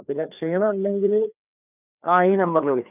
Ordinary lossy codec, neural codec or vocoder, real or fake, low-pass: none; codec, 16 kHz, 2 kbps, FreqCodec, larger model; fake; 3.6 kHz